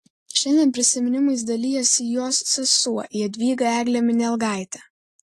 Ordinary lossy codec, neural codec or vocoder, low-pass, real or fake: AAC, 48 kbps; none; 14.4 kHz; real